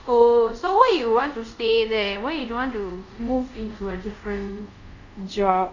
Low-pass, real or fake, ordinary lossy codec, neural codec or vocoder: 7.2 kHz; fake; none; codec, 24 kHz, 0.5 kbps, DualCodec